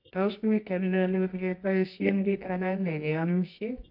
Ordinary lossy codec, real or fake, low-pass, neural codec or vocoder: AAC, 48 kbps; fake; 5.4 kHz; codec, 24 kHz, 0.9 kbps, WavTokenizer, medium music audio release